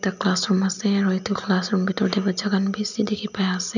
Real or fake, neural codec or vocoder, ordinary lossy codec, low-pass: real; none; none; 7.2 kHz